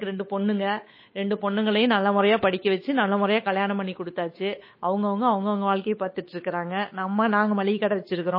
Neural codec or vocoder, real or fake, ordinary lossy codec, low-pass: codec, 16 kHz, 8 kbps, FunCodec, trained on Chinese and English, 25 frames a second; fake; MP3, 24 kbps; 5.4 kHz